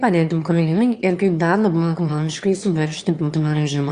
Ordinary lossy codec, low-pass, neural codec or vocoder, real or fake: Opus, 64 kbps; 9.9 kHz; autoencoder, 22.05 kHz, a latent of 192 numbers a frame, VITS, trained on one speaker; fake